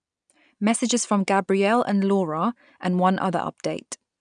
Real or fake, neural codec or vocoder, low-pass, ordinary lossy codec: real; none; 9.9 kHz; none